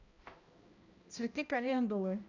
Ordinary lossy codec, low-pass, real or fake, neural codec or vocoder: Opus, 64 kbps; 7.2 kHz; fake; codec, 16 kHz, 1 kbps, X-Codec, HuBERT features, trained on balanced general audio